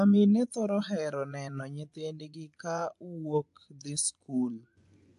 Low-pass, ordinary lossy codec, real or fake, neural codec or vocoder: 10.8 kHz; AAC, 64 kbps; fake; vocoder, 24 kHz, 100 mel bands, Vocos